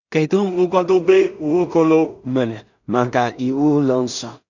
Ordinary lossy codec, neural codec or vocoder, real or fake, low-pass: none; codec, 16 kHz in and 24 kHz out, 0.4 kbps, LongCat-Audio-Codec, two codebook decoder; fake; 7.2 kHz